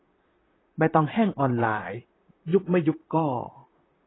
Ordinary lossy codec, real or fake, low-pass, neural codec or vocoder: AAC, 16 kbps; fake; 7.2 kHz; vocoder, 44.1 kHz, 128 mel bands, Pupu-Vocoder